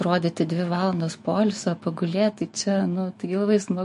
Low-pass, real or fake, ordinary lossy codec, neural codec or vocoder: 14.4 kHz; fake; MP3, 48 kbps; autoencoder, 48 kHz, 128 numbers a frame, DAC-VAE, trained on Japanese speech